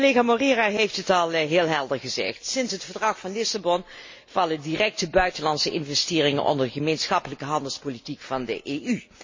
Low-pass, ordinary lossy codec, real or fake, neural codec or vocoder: 7.2 kHz; MP3, 32 kbps; real; none